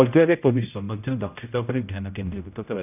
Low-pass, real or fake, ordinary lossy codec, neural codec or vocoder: 3.6 kHz; fake; none; codec, 16 kHz, 0.5 kbps, X-Codec, HuBERT features, trained on general audio